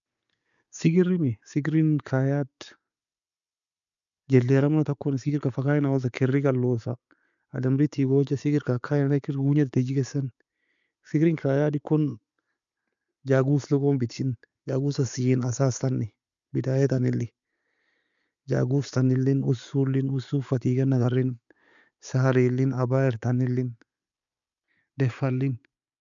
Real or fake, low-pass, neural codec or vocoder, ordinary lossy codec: real; 7.2 kHz; none; none